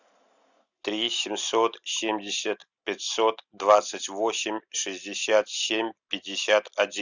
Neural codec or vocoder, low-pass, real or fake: none; 7.2 kHz; real